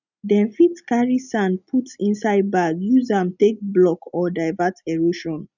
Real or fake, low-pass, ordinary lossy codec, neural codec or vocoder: real; 7.2 kHz; none; none